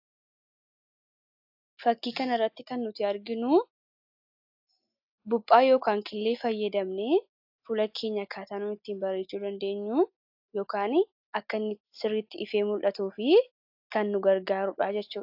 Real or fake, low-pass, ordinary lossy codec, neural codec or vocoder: real; 5.4 kHz; AAC, 48 kbps; none